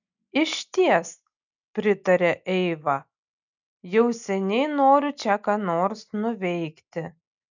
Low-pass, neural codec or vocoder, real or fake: 7.2 kHz; none; real